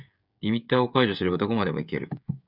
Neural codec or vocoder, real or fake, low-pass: codec, 16 kHz, 16 kbps, FreqCodec, smaller model; fake; 5.4 kHz